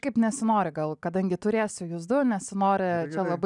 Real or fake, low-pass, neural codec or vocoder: real; 10.8 kHz; none